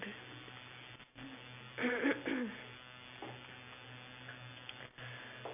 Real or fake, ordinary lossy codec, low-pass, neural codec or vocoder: real; none; 3.6 kHz; none